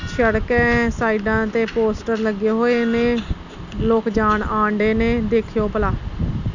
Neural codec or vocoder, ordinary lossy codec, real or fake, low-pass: none; none; real; 7.2 kHz